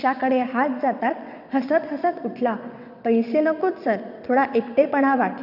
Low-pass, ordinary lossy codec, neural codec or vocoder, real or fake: 5.4 kHz; none; vocoder, 22.05 kHz, 80 mel bands, WaveNeXt; fake